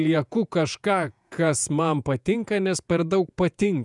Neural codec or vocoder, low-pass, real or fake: vocoder, 48 kHz, 128 mel bands, Vocos; 10.8 kHz; fake